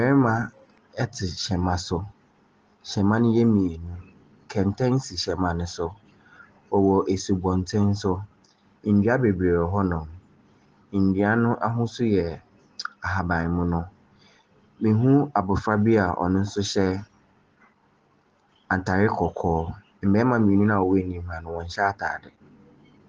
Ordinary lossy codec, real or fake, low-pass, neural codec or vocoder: Opus, 16 kbps; real; 7.2 kHz; none